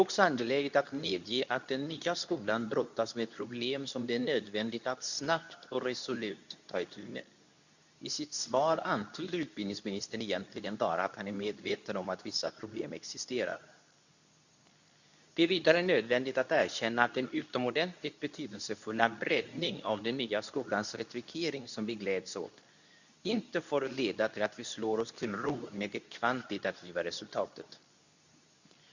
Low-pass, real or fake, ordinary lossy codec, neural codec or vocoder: 7.2 kHz; fake; none; codec, 24 kHz, 0.9 kbps, WavTokenizer, medium speech release version 2